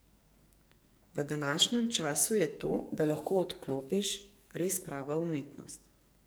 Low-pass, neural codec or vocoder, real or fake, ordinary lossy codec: none; codec, 44.1 kHz, 2.6 kbps, SNAC; fake; none